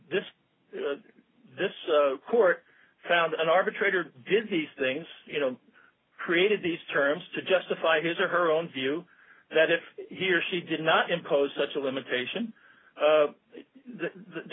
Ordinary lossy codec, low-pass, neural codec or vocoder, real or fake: AAC, 16 kbps; 7.2 kHz; none; real